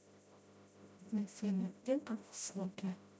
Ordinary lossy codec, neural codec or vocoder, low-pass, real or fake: none; codec, 16 kHz, 0.5 kbps, FreqCodec, smaller model; none; fake